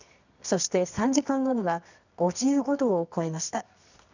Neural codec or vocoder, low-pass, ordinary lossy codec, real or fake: codec, 24 kHz, 0.9 kbps, WavTokenizer, medium music audio release; 7.2 kHz; none; fake